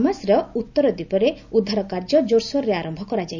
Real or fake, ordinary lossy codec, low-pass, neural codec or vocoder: real; none; 7.2 kHz; none